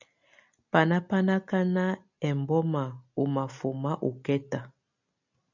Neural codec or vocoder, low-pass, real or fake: none; 7.2 kHz; real